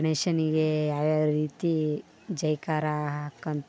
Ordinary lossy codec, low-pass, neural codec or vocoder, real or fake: none; none; none; real